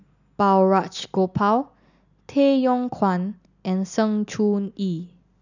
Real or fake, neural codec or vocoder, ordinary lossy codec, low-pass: real; none; none; 7.2 kHz